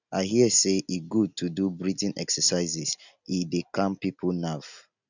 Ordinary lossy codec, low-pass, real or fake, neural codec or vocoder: none; 7.2 kHz; real; none